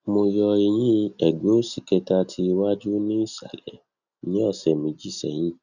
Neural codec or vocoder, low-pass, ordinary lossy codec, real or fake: none; 7.2 kHz; none; real